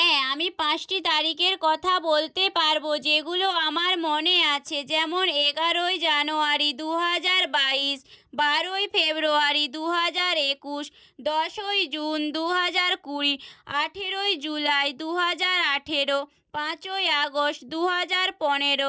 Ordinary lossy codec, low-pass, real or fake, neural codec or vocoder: none; none; real; none